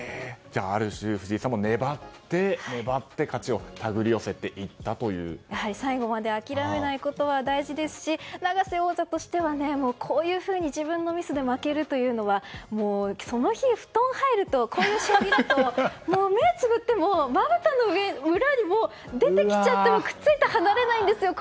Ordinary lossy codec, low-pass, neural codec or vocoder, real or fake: none; none; none; real